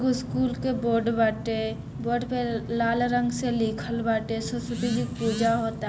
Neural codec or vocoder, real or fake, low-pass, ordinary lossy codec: none; real; none; none